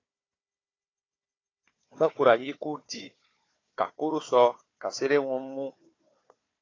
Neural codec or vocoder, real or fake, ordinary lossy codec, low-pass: codec, 16 kHz, 4 kbps, FunCodec, trained on Chinese and English, 50 frames a second; fake; AAC, 32 kbps; 7.2 kHz